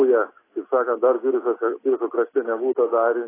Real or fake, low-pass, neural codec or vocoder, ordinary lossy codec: real; 3.6 kHz; none; AAC, 16 kbps